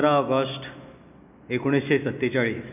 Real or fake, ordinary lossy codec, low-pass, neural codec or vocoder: real; none; 3.6 kHz; none